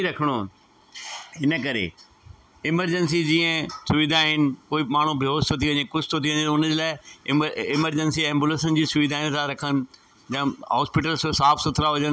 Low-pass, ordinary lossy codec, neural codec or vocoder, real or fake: none; none; none; real